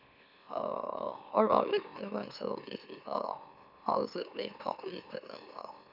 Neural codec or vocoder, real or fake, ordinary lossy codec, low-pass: autoencoder, 44.1 kHz, a latent of 192 numbers a frame, MeloTTS; fake; none; 5.4 kHz